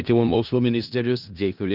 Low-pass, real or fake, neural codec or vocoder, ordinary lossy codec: 5.4 kHz; fake; codec, 16 kHz in and 24 kHz out, 0.4 kbps, LongCat-Audio-Codec, four codebook decoder; Opus, 24 kbps